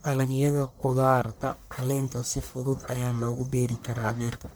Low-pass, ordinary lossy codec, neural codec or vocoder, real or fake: none; none; codec, 44.1 kHz, 1.7 kbps, Pupu-Codec; fake